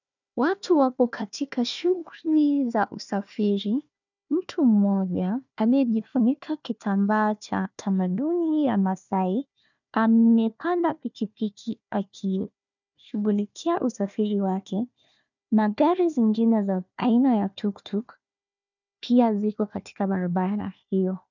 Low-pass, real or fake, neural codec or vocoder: 7.2 kHz; fake; codec, 16 kHz, 1 kbps, FunCodec, trained on Chinese and English, 50 frames a second